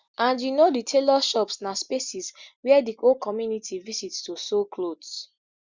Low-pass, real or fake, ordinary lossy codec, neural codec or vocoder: 7.2 kHz; real; Opus, 64 kbps; none